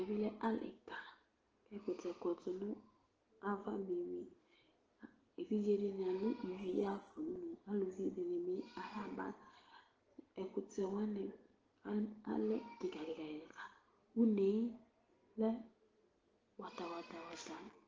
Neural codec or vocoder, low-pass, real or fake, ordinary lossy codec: none; 7.2 kHz; real; Opus, 16 kbps